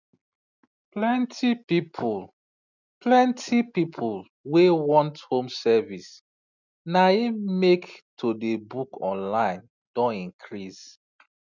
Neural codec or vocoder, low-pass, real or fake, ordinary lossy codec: none; 7.2 kHz; real; none